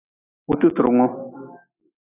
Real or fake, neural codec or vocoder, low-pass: real; none; 3.6 kHz